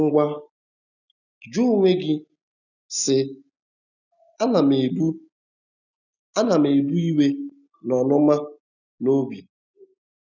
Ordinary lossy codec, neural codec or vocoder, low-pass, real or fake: none; none; 7.2 kHz; real